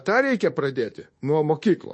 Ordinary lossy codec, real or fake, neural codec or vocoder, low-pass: MP3, 32 kbps; fake; codec, 24 kHz, 1.2 kbps, DualCodec; 10.8 kHz